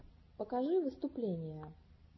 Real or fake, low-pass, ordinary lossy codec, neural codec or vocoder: real; 7.2 kHz; MP3, 24 kbps; none